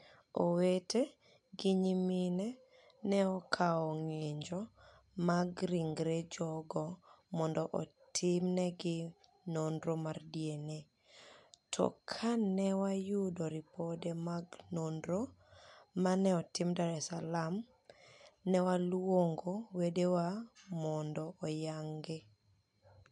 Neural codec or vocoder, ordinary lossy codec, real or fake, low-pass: none; MP3, 64 kbps; real; 10.8 kHz